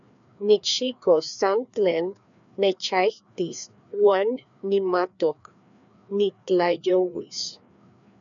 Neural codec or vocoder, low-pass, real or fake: codec, 16 kHz, 2 kbps, FreqCodec, larger model; 7.2 kHz; fake